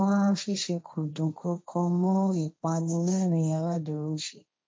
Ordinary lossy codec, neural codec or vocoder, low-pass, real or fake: none; codec, 16 kHz, 1.1 kbps, Voila-Tokenizer; none; fake